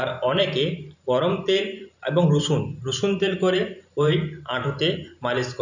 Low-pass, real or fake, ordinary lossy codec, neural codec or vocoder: 7.2 kHz; fake; none; vocoder, 44.1 kHz, 128 mel bands every 512 samples, BigVGAN v2